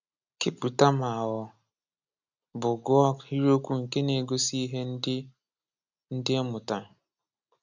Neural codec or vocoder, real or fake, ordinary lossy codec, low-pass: none; real; none; 7.2 kHz